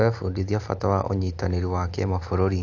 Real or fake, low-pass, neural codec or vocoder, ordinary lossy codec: real; 7.2 kHz; none; AAC, 48 kbps